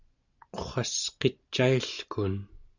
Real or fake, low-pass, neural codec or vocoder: real; 7.2 kHz; none